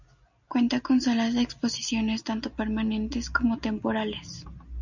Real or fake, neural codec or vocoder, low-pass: real; none; 7.2 kHz